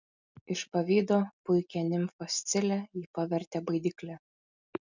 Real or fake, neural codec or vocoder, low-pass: real; none; 7.2 kHz